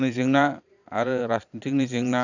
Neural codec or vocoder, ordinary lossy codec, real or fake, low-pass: vocoder, 22.05 kHz, 80 mel bands, Vocos; none; fake; 7.2 kHz